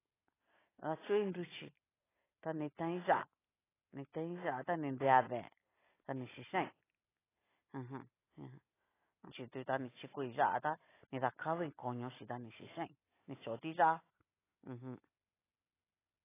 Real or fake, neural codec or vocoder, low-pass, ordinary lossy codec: real; none; 3.6 kHz; AAC, 16 kbps